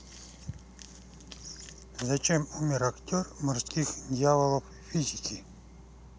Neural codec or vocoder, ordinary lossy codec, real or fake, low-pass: none; none; real; none